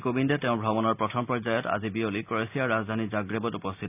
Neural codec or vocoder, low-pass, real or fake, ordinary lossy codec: none; 3.6 kHz; real; none